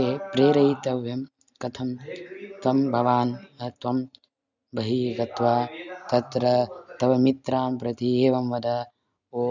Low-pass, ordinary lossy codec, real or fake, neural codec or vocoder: 7.2 kHz; none; real; none